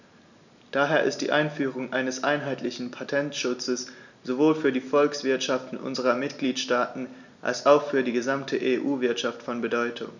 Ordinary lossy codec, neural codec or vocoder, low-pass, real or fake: none; none; 7.2 kHz; real